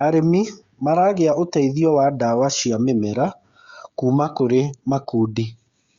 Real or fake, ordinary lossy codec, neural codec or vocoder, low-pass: fake; Opus, 64 kbps; codec, 16 kHz, 16 kbps, FreqCodec, smaller model; 7.2 kHz